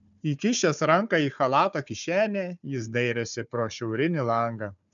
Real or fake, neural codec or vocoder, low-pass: fake; codec, 16 kHz, 4 kbps, FunCodec, trained on Chinese and English, 50 frames a second; 7.2 kHz